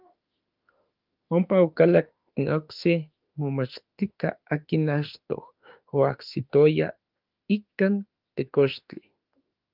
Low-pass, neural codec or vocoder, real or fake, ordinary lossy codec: 5.4 kHz; autoencoder, 48 kHz, 32 numbers a frame, DAC-VAE, trained on Japanese speech; fake; Opus, 24 kbps